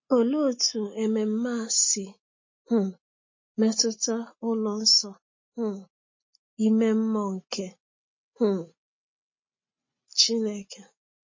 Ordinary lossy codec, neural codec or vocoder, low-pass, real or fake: MP3, 32 kbps; none; 7.2 kHz; real